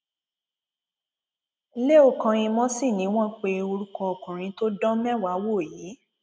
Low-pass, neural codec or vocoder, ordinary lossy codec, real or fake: none; none; none; real